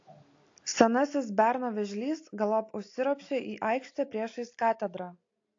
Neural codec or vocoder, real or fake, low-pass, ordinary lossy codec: none; real; 7.2 kHz; AAC, 48 kbps